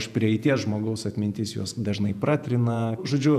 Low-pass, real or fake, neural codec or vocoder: 14.4 kHz; fake; vocoder, 48 kHz, 128 mel bands, Vocos